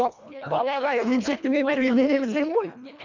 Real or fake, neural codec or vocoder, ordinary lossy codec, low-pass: fake; codec, 24 kHz, 1.5 kbps, HILCodec; MP3, 64 kbps; 7.2 kHz